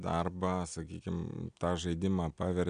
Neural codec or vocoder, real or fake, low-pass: none; real; 9.9 kHz